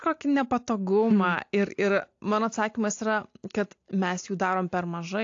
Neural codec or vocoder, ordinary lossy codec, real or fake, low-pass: none; AAC, 48 kbps; real; 7.2 kHz